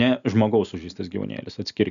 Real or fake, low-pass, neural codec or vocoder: real; 7.2 kHz; none